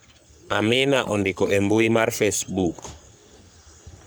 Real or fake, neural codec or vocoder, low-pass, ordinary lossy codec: fake; codec, 44.1 kHz, 3.4 kbps, Pupu-Codec; none; none